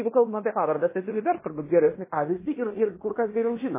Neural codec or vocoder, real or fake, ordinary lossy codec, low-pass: codec, 16 kHz in and 24 kHz out, 0.9 kbps, LongCat-Audio-Codec, fine tuned four codebook decoder; fake; MP3, 16 kbps; 3.6 kHz